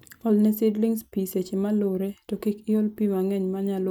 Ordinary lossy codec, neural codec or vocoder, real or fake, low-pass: none; none; real; none